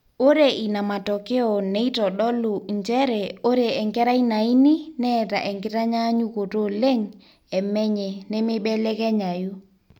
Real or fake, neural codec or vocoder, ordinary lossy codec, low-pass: real; none; none; 19.8 kHz